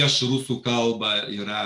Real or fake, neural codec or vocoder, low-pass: real; none; 10.8 kHz